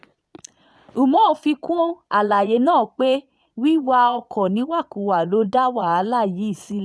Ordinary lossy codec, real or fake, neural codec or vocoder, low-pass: none; fake; vocoder, 22.05 kHz, 80 mel bands, Vocos; none